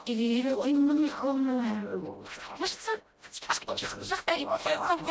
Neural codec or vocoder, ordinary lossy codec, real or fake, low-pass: codec, 16 kHz, 0.5 kbps, FreqCodec, smaller model; none; fake; none